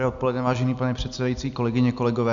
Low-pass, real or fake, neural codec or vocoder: 7.2 kHz; real; none